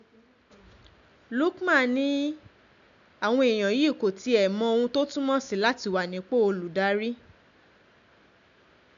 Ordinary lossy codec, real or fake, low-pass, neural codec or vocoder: none; real; 7.2 kHz; none